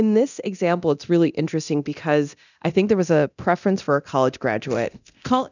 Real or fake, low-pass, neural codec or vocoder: fake; 7.2 kHz; codec, 24 kHz, 0.9 kbps, DualCodec